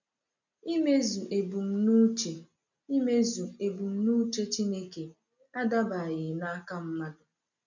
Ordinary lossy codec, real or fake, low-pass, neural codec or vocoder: none; real; 7.2 kHz; none